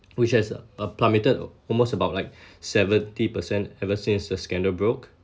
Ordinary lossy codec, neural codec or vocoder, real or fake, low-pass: none; none; real; none